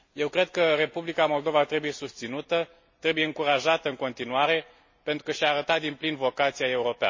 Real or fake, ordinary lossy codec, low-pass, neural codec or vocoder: real; MP3, 32 kbps; 7.2 kHz; none